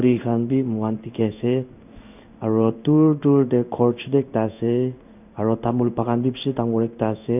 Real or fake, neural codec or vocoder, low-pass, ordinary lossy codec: fake; codec, 16 kHz in and 24 kHz out, 1 kbps, XY-Tokenizer; 3.6 kHz; none